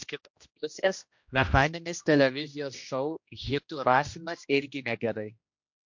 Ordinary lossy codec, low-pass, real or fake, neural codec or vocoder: MP3, 48 kbps; 7.2 kHz; fake; codec, 16 kHz, 1 kbps, X-Codec, HuBERT features, trained on general audio